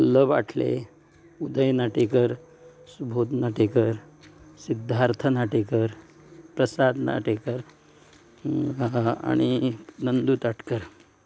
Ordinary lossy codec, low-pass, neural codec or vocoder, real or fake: none; none; none; real